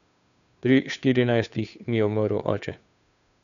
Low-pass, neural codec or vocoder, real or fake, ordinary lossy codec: 7.2 kHz; codec, 16 kHz, 2 kbps, FunCodec, trained on Chinese and English, 25 frames a second; fake; none